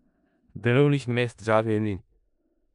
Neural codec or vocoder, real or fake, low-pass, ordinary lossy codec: codec, 16 kHz in and 24 kHz out, 0.4 kbps, LongCat-Audio-Codec, four codebook decoder; fake; 10.8 kHz; none